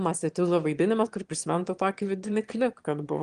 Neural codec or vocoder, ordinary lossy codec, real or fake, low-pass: autoencoder, 22.05 kHz, a latent of 192 numbers a frame, VITS, trained on one speaker; Opus, 24 kbps; fake; 9.9 kHz